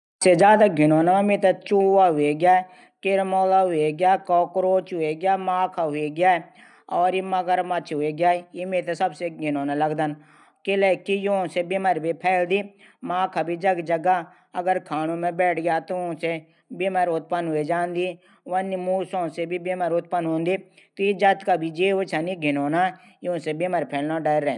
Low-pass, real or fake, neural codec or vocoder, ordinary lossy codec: 10.8 kHz; real; none; none